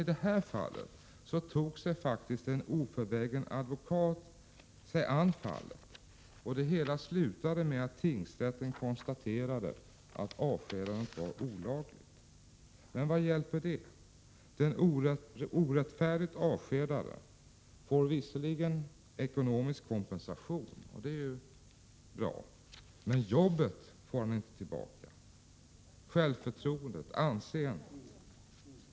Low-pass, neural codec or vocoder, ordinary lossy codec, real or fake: none; none; none; real